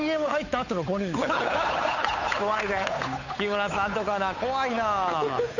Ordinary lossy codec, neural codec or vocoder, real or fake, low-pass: none; codec, 16 kHz, 8 kbps, FunCodec, trained on Chinese and English, 25 frames a second; fake; 7.2 kHz